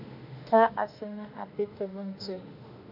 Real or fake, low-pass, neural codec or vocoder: fake; 5.4 kHz; autoencoder, 48 kHz, 32 numbers a frame, DAC-VAE, trained on Japanese speech